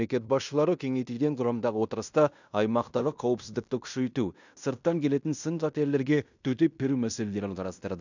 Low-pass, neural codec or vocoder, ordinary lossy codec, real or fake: 7.2 kHz; codec, 16 kHz in and 24 kHz out, 0.9 kbps, LongCat-Audio-Codec, fine tuned four codebook decoder; none; fake